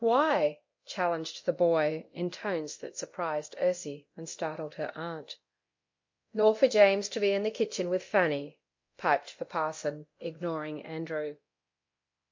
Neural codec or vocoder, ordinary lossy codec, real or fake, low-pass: codec, 24 kHz, 0.9 kbps, DualCodec; MP3, 48 kbps; fake; 7.2 kHz